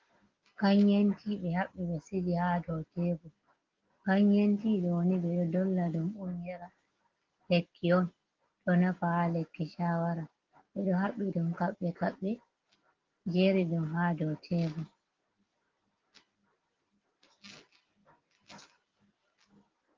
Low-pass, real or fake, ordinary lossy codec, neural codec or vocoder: 7.2 kHz; real; Opus, 16 kbps; none